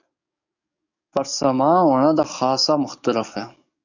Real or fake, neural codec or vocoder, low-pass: fake; codec, 44.1 kHz, 7.8 kbps, DAC; 7.2 kHz